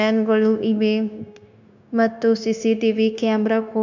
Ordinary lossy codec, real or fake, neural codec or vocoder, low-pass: none; fake; codec, 16 kHz, 0.9 kbps, LongCat-Audio-Codec; 7.2 kHz